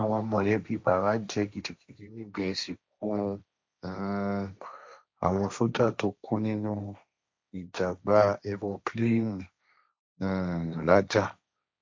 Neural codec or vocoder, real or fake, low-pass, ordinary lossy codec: codec, 16 kHz, 1.1 kbps, Voila-Tokenizer; fake; none; none